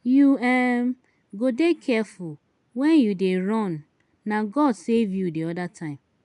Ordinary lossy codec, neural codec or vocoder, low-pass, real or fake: none; none; 10.8 kHz; real